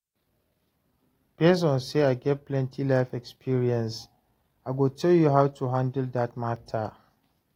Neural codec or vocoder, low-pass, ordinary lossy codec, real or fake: none; 19.8 kHz; AAC, 48 kbps; real